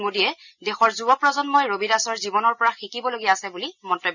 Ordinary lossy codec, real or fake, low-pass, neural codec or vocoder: none; real; 7.2 kHz; none